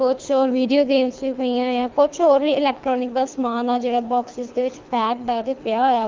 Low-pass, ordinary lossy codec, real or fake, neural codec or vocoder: 7.2 kHz; Opus, 24 kbps; fake; codec, 24 kHz, 3 kbps, HILCodec